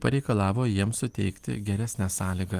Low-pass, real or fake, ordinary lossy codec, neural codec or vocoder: 14.4 kHz; real; Opus, 24 kbps; none